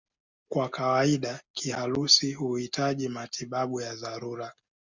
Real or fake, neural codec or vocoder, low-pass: real; none; 7.2 kHz